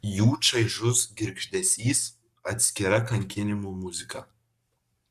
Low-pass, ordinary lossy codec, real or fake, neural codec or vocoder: 14.4 kHz; Opus, 64 kbps; fake; codec, 44.1 kHz, 7.8 kbps, Pupu-Codec